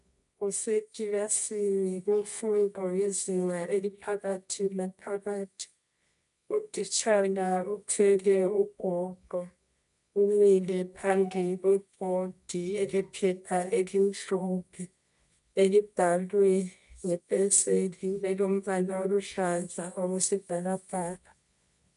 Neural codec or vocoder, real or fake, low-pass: codec, 24 kHz, 0.9 kbps, WavTokenizer, medium music audio release; fake; 10.8 kHz